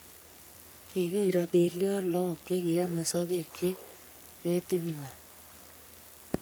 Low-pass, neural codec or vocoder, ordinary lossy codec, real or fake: none; codec, 44.1 kHz, 3.4 kbps, Pupu-Codec; none; fake